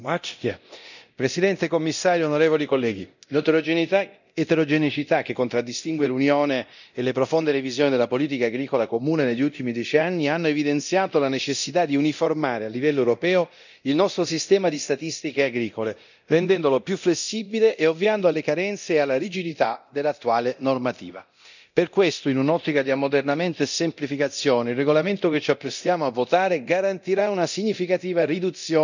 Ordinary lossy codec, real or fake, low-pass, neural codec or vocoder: none; fake; 7.2 kHz; codec, 24 kHz, 0.9 kbps, DualCodec